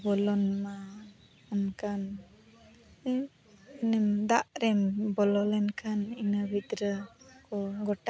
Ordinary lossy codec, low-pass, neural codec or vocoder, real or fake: none; none; none; real